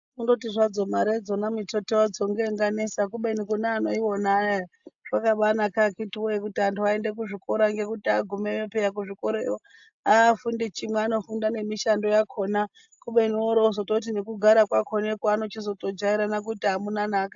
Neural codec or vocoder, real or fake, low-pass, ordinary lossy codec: none; real; 7.2 kHz; AAC, 64 kbps